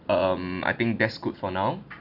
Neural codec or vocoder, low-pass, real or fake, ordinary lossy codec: none; 5.4 kHz; real; none